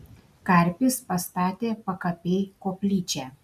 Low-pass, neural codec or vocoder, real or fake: 14.4 kHz; none; real